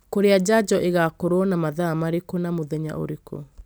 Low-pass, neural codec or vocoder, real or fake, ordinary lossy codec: none; none; real; none